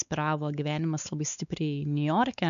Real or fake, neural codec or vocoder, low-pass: fake; codec, 16 kHz, 4 kbps, X-Codec, WavLM features, trained on Multilingual LibriSpeech; 7.2 kHz